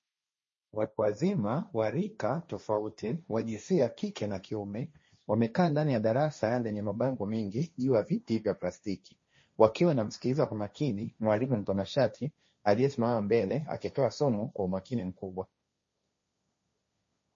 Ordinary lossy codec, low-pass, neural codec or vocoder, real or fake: MP3, 32 kbps; 7.2 kHz; codec, 16 kHz, 1.1 kbps, Voila-Tokenizer; fake